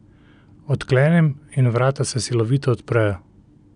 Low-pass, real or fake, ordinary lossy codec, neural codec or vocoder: 9.9 kHz; real; none; none